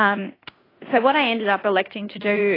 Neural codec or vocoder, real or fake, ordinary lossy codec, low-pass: codec, 16 kHz in and 24 kHz out, 0.9 kbps, LongCat-Audio-Codec, fine tuned four codebook decoder; fake; AAC, 24 kbps; 5.4 kHz